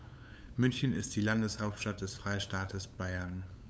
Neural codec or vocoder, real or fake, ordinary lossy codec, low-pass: codec, 16 kHz, 8 kbps, FunCodec, trained on LibriTTS, 25 frames a second; fake; none; none